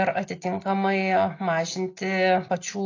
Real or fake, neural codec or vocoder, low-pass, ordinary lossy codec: real; none; 7.2 kHz; AAC, 32 kbps